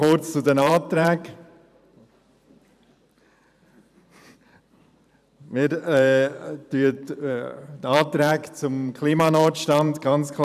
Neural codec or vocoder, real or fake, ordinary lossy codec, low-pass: vocoder, 44.1 kHz, 128 mel bands every 512 samples, BigVGAN v2; fake; none; 14.4 kHz